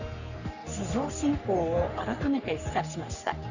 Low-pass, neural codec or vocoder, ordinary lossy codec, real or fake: 7.2 kHz; codec, 44.1 kHz, 3.4 kbps, Pupu-Codec; none; fake